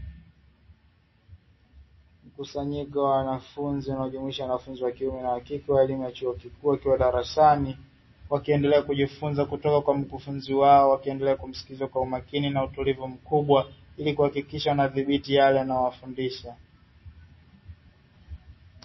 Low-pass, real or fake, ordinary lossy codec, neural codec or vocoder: 7.2 kHz; real; MP3, 24 kbps; none